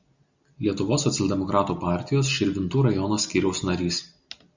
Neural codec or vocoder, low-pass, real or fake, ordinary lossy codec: none; 7.2 kHz; real; Opus, 64 kbps